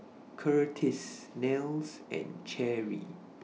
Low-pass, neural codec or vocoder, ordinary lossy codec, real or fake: none; none; none; real